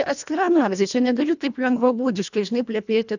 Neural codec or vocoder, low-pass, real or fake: codec, 24 kHz, 1.5 kbps, HILCodec; 7.2 kHz; fake